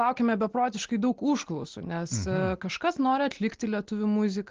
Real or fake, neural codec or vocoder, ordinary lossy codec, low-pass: real; none; Opus, 16 kbps; 7.2 kHz